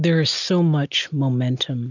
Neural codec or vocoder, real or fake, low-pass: none; real; 7.2 kHz